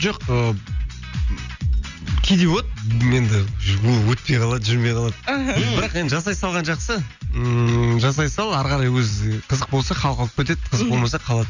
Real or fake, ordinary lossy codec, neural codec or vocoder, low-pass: real; none; none; 7.2 kHz